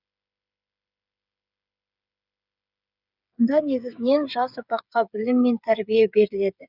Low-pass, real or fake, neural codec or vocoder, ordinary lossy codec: 5.4 kHz; fake; codec, 16 kHz, 8 kbps, FreqCodec, smaller model; none